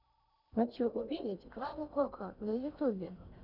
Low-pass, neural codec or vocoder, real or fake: 5.4 kHz; codec, 16 kHz in and 24 kHz out, 0.8 kbps, FocalCodec, streaming, 65536 codes; fake